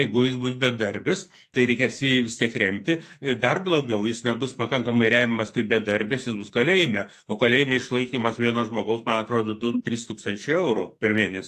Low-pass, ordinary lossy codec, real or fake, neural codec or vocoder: 14.4 kHz; AAC, 64 kbps; fake; codec, 44.1 kHz, 2.6 kbps, SNAC